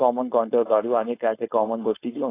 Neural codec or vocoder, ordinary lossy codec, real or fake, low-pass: codec, 16 kHz, 4.8 kbps, FACodec; AAC, 24 kbps; fake; 3.6 kHz